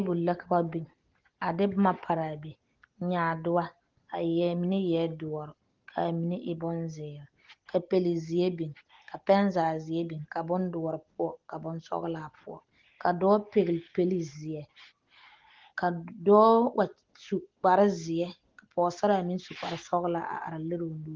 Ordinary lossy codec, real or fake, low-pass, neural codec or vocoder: Opus, 16 kbps; real; 7.2 kHz; none